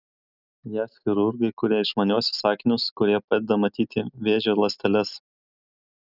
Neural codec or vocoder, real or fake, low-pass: none; real; 5.4 kHz